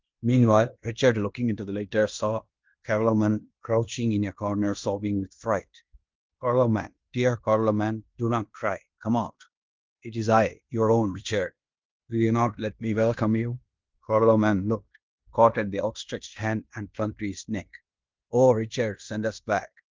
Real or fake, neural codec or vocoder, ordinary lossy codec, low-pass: fake; codec, 16 kHz in and 24 kHz out, 0.9 kbps, LongCat-Audio-Codec, fine tuned four codebook decoder; Opus, 32 kbps; 7.2 kHz